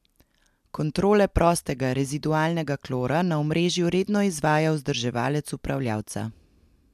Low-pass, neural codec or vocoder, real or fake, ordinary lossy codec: 14.4 kHz; none; real; MP3, 96 kbps